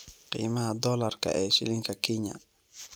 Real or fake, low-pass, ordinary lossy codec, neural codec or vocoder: real; none; none; none